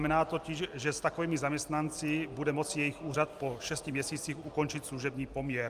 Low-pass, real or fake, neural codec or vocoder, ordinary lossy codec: 14.4 kHz; real; none; Opus, 32 kbps